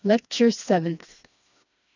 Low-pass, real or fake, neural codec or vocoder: 7.2 kHz; fake; codec, 16 kHz, 2 kbps, FreqCodec, smaller model